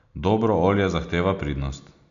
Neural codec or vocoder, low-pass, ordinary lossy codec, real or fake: none; 7.2 kHz; none; real